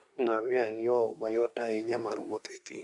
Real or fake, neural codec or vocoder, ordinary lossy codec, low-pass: fake; codec, 24 kHz, 1 kbps, SNAC; none; 10.8 kHz